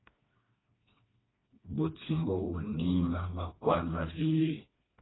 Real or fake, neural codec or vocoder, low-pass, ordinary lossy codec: fake; codec, 16 kHz, 2 kbps, FreqCodec, smaller model; 7.2 kHz; AAC, 16 kbps